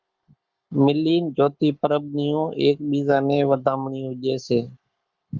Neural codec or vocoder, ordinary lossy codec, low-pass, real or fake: codec, 44.1 kHz, 7.8 kbps, Pupu-Codec; Opus, 24 kbps; 7.2 kHz; fake